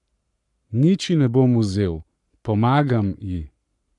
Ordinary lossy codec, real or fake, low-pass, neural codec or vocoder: none; fake; 10.8 kHz; codec, 44.1 kHz, 7.8 kbps, Pupu-Codec